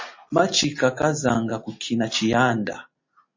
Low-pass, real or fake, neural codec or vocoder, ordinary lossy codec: 7.2 kHz; real; none; MP3, 32 kbps